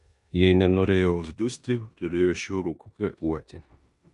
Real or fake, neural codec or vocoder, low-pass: fake; codec, 16 kHz in and 24 kHz out, 0.9 kbps, LongCat-Audio-Codec, four codebook decoder; 10.8 kHz